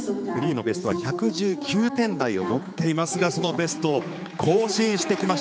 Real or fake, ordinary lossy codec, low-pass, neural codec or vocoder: fake; none; none; codec, 16 kHz, 4 kbps, X-Codec, HuBERT features, trained on general audio